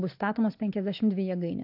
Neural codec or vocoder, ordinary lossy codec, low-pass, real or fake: none; AAC, 48 kbps; 5.4 kHz; real